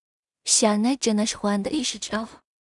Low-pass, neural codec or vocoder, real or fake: 10.8 kHz; codec, 16 kHz in and 24 kHz out, 0.4 kbps, LongCat-Audio-Codec, two codebook decoder; fake